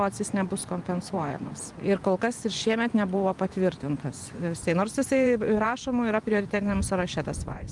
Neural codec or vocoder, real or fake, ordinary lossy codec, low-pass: vocoder, 24 kHz, 100 mel bands, Vocos; fake; Opus, 32 kbps; 10.8 kHz